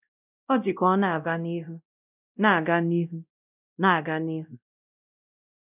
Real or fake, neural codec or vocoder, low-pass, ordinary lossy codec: fake; codec, 16 kHz, 0.5 kbps, X-Codec, WavLM features, trained on Multilingual LibriSpeech; 3.6 kHz; none